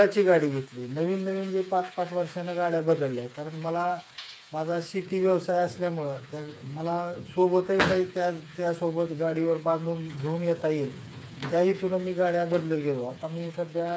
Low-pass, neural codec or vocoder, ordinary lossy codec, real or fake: none; codec, 16 kHz, 4 kbps, FreqCodec, smaller model; none; fake